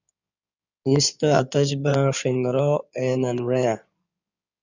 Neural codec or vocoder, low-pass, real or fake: codec, 16 kHz in and 24 kHz out, 2.2 kbps, FireRedTTS-2 codec; 7.2 kHz; fake